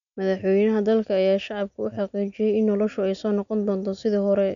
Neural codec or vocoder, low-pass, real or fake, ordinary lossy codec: none; 7.2 kHz; real; none